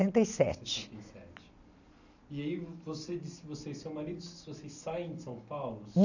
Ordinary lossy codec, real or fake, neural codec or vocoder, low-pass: none; real; none; 7.2 kHz